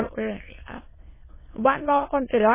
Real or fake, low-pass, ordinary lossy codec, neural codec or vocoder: fake; 3.6 kHz; MP3, 16 kbps; autoencoder, 22.05 kHz, a latent of 192 numbers a frame, VITS, trained on many speakers